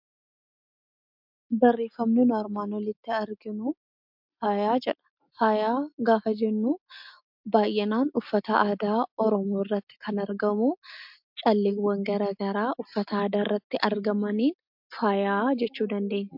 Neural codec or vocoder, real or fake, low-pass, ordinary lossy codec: none; real; 5.4 kHz; MP3, 48 kbps